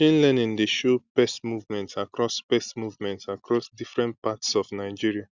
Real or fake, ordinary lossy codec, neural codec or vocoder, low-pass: real; Opus, 64 kbps; none; 7.2 kHz